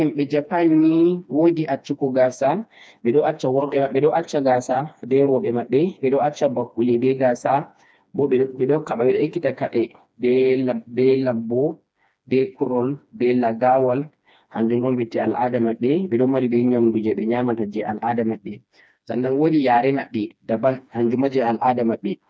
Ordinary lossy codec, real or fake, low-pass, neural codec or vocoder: none; fake; none; codec, 16 kHz, 2 kbps, FreqCodec, smaller model